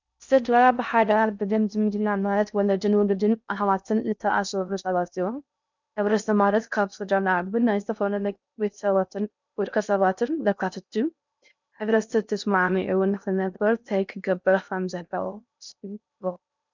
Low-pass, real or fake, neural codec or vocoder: 7.2 kHz; fake; codec, 16 kHz in and 24 kHz out, 0.6 kbps, FocalCodec, streaming, 2048 codes